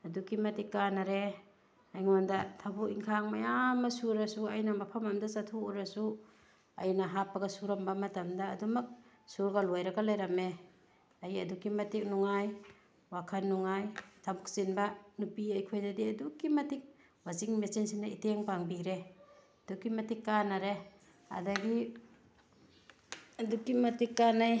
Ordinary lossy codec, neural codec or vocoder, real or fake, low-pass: none; none; real; none